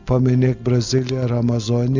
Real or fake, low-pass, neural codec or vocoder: real; 7.2 kHz; none